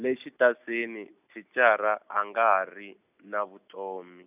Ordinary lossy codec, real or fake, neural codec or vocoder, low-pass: none; real; none; 3.6 kHz